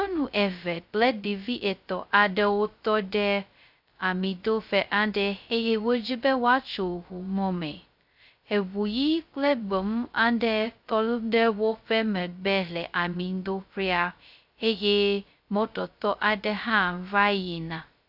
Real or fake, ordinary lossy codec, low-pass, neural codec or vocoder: fake; AAC, 48 kbps; 5.4 kHz; codec, 16 kHz, 0.2 kbps, FocalCodec